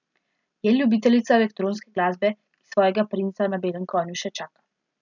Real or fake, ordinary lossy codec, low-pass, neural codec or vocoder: real; none; 7.2 kHz; none